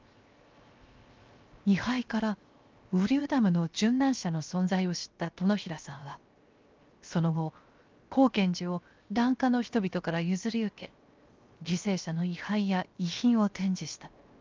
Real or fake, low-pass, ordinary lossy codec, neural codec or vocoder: fake; 7.2 kHz; Opus, 24 kbps; codec, 16 kHz, 0.7 kbps, FocalCodec